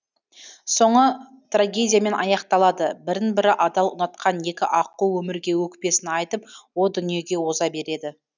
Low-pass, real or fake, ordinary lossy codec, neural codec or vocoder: 7.2 kHz; real; none; none